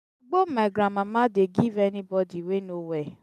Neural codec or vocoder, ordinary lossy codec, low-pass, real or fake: none; none; 14.4 kHz; real